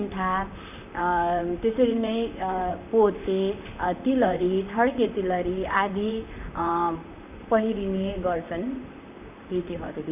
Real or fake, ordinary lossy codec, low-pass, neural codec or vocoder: fake; none; 3.6 kHz; vocoder, 44.1 kHz, 128 mel bands, Pupu-Vocoder